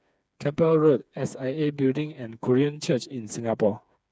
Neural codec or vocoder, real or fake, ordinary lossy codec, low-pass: codec, 16 kHz, 4 kbps, FreqCodec, smaller model; fake; none; none